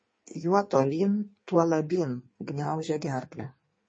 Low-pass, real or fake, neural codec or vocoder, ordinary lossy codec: 9.9 kHz; fake; codec, 16 kHz in and 24 kHz out, 1.1 kbps, FireRedTTS-2 codec; MP3, 32 kbps